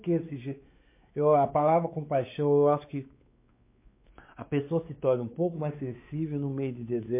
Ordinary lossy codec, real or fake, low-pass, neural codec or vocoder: MP3, 24 kbps; fake; 3.6 kHz; codec, 16 kHz, 4 kbps, X-Codec, WavLM features, trained on Multilingual LibriSpeech